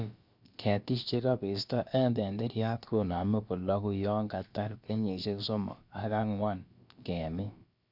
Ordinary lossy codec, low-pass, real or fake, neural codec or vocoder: none; 5.4 kHz; fake; codec, 16 kHz, about 1 kbps, DyCAST, with the encoder's durations